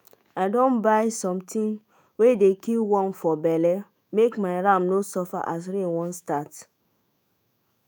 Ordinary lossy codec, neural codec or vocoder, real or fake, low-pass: none; autoencoder, 48 kHz, 128 numbers a frame, DAC-VAE, trained on Japanese speech; fake; none